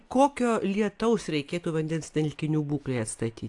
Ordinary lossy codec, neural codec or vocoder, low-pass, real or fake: AAC, 64 kbps; none; 10.8 kHz; real